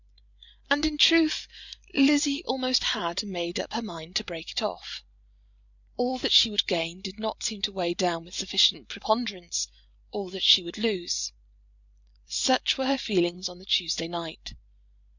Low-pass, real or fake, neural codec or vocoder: 7.2 kHz; fake; vocoder, 44.1 kHz, 128 mel bands every 512 samples, BigVGAN v2